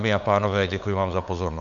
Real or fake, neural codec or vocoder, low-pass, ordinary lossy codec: fake; codec, 16 kHz, 8 kbps, FunCodec, trained on LibriTTS, 25 frames a second; 7.2 kHz; MP3, 96 kbps